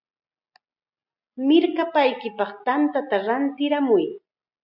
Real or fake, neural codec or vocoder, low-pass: real; none; 5.4 kHz